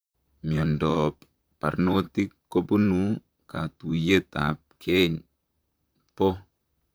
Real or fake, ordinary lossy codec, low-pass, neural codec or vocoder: fake; none; none; vocoder, 44.1 kHz, 128 mel bands, Pupu-Vocoder